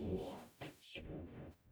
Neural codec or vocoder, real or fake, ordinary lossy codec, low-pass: codec, 44.1 kHz, 0.9 kbps, DAC; fake; none; none